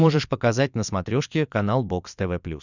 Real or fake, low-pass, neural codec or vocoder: fake; 7.2 kHz; vocoder, 22.05 kHz, 80 mel bands, Vocos